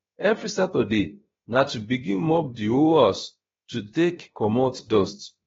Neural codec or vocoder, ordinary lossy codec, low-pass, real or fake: codec, 16 kHz, about 1 kbps, DyCAST, with the encoder's durations; AAC, 24 kbps; 7.2 kHz; fake